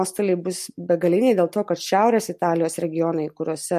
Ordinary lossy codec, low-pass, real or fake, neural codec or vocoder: MP3, 64 kbps; 14.4 kHz; real; none